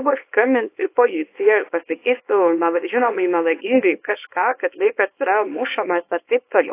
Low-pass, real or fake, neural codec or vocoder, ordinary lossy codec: 3.6 kHz; fake; codec, 24 kHz, 0.9 kbps, WavTokenizer, small release; AAC, 24 kbps